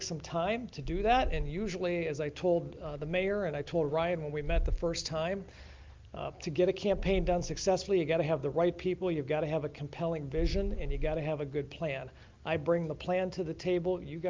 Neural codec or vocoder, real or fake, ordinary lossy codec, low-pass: none; real; Opus, 24 kbps; 7.2 kHz